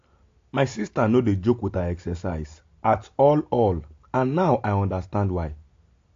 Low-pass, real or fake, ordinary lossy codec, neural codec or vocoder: 7.2 kHz; real; AAC, 48 kbps; none